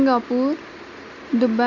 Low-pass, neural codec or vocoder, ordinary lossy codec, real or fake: 7.2 kHz; none; none; real